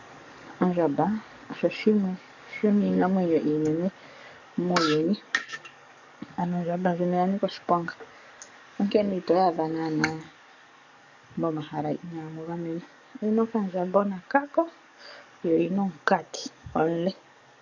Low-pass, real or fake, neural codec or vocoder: 7.2 kHz; fake; codec, 44.1 kHz, 7.8 kbps, DAC